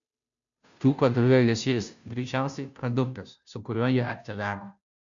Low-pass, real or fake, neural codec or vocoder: 7.2 kHz; fake; codec, 16 kHz, 0.5 kbps, FunCodec, trained on Chinese and English, 25 frames a second